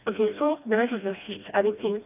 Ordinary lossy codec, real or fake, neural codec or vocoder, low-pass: none; fake; codec, 16 kHz, 1 kbps, FreqCodec, smaller model; 3.6 kHz